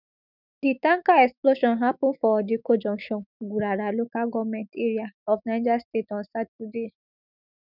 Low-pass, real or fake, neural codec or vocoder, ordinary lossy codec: 5.4 kHz; fake; autoencoder, 48 kHz, 128 numbers a frame, DAC-VAE, trained on Japanese speech; none